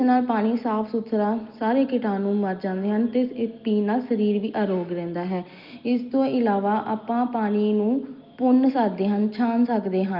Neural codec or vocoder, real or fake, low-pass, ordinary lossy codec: none; real; 5.4 kHz; Opus, 24 kbps